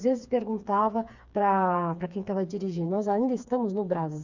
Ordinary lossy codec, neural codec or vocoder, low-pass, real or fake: Opus, 64 kbps; codec, 16 kHz, 4 kbps, FreqCodec, smaller model; 7.2 kHz; fake